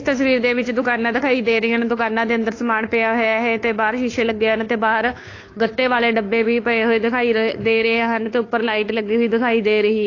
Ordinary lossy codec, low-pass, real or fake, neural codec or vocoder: AAC, 32 kbps; 7.2 kHz; fake; codec, 16 kHz, 2 kbps, FunCodec, trained on Chinese and English, 25 frames a second